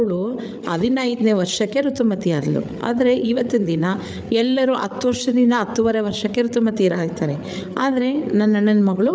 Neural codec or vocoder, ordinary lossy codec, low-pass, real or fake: codec, 16 kHz, 8 kbps, FreqCodec, larger model; none; none; fake